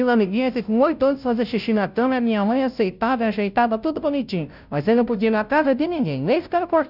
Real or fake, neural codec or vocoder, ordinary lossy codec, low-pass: fake; codec, 16 kHz, 0.5 kbps, FunCodec, trained on Chinese and English, 25 frames a second; none; 5.4 kHz